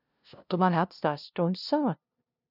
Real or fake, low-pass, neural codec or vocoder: fake; 5.4 kHz; codec, 16 kHz, 0.5 kbps, FunCodec, trained on LibriTTS, 25 frames a second